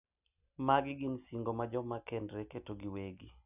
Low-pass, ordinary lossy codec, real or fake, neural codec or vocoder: 3.6 kHz; none; real; none